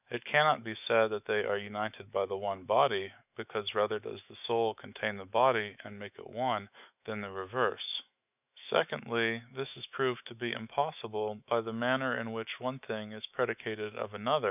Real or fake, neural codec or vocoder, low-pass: fake; autoencoder, 48 kHz, 128 numbers a frame, DAC-VAE, trained on Japanese speech; 3.6 kHz